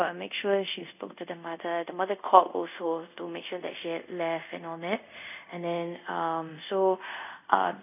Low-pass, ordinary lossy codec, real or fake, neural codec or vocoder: 3.6 kHz; none; fake; codec, 24 kHz, 0.5 kbps, DualCodec